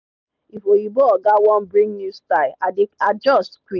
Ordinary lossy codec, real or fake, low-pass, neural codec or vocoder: none; real; 7.2 kHz; none